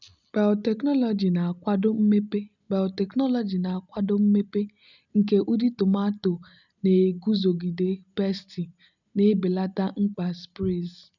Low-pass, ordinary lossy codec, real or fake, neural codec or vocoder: 7.2 kHz; none; real; none